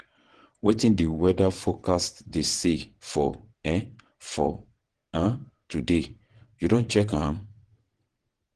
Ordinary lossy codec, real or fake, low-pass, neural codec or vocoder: Opus, 16 kbps; fake; 9.9 kHz; vocoder, 22.05 kHz, 80 mel bands, WaveNeXt